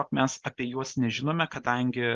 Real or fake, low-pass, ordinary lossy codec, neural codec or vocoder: real; 7.2 kHz; Opus, 32 kbps; none